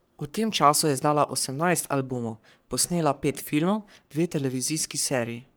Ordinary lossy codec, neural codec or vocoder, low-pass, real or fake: none; codec, 44.1 kHz, 3.4 kbps, Pupu-Codec; none; fake